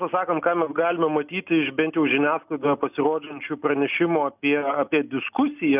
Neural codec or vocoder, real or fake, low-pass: none; real; 3.6 kHz